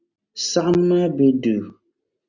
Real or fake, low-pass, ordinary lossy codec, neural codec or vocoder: real; 7.2 kHz; Opus, 64 kbps; none